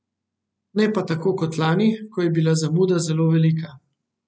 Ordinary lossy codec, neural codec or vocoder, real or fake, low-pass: none; none; real; none